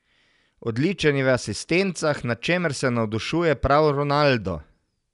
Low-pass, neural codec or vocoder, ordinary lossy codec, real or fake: 10.8 kHz; none; none; real